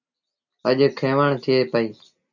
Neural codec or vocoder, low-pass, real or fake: none; 7.2 kHz; real